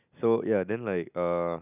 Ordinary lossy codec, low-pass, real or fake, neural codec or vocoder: none; 3.6 kHz; real; none